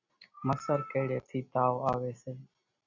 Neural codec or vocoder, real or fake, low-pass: none; real; 7.2 kHz